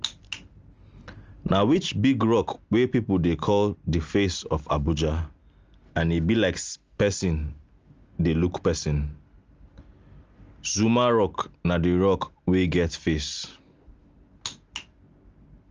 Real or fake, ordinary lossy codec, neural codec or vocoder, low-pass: real; Opus, 24 kbps; none; 7.2 kHz